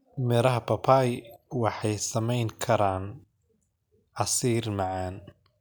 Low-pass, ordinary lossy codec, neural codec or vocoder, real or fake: none; none; none; real